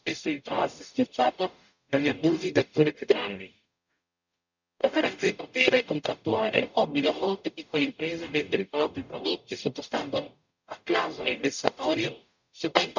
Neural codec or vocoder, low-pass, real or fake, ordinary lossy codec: codec, 44.1 kHz, 0.9 kbps, DAC; 7.2 kHz; fake; none